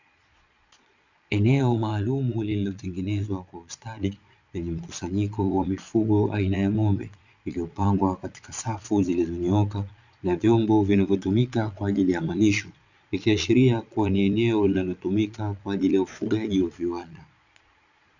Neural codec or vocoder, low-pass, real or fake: vocoder, 22.05 kHz, 80 mel bands, Vocos; 7.2 kHz; fake